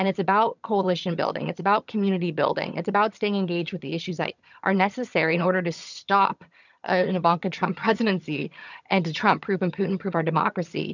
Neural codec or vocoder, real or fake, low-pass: vocoder, 22.05 kHz, 80 mel bands, HiFi-GAN; fake; 7.2 kHz